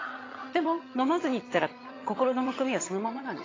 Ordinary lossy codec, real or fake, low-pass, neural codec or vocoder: AAC, 32 kbps; fake; 7.2 kHz; vocoder, 22.05 kHz, 80 mel bands, HiFi-GAN